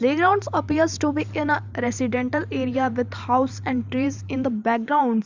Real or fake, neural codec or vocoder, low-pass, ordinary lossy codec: fake; vocoder, 44.1 kHz, 128 mel bands every 512 samples, BigVGAN v2; 7.2 kHz; Opus, 64 kbps